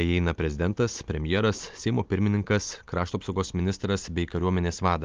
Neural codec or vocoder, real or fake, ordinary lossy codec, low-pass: none; real; Opus, 32 kbps; 7.2 kHz